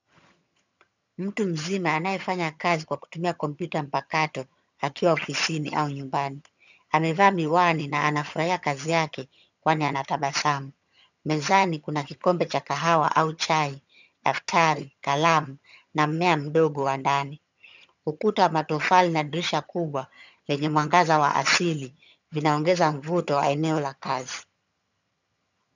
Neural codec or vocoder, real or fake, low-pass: vocoder, 22.05 kHz, 80 mel bands, HiFi-GAN; fake; 7.2 kHz